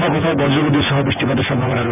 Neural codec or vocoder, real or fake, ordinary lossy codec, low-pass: vocoder, 24 kHz, 100 mel bands, Vocos; fake; none; 3.6 kHz